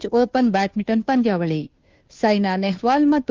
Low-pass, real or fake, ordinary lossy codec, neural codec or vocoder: 7.2 kHz; fake; Opus, 32 kbps; codec, 16 kHz, 2 kbps, FunCodec, trained on Chinese and English, 25 frames a second